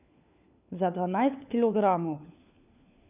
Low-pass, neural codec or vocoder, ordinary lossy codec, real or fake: 3.6 kHz; codec, 16 kHz, 4 kbps, FunCodec, trained on LibriTTS, 50 frames a second; none; fake